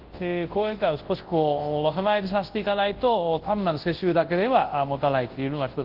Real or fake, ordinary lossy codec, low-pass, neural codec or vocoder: fake; Opus, 16 kbps; 5.4 kHz; codec, 24 kHz, 0.9 kbps, WavTokenizer, large speech release